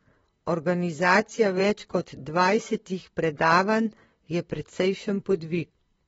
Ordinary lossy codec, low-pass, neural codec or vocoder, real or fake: AAC, 24 kbps; 19.8 kHz; vocoder, 44.1 kHz, 128 mel bands, Pupu-Vocoder; fake